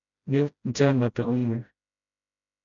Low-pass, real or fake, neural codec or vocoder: 7.2 kHz; fake; codec, 16 kHz, 0.5 kbps, FreqCodec, smaller model